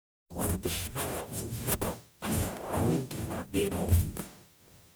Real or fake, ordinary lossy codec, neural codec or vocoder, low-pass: fake; none; codec, 44.1 kHz, 0.9 kbps, DAC; none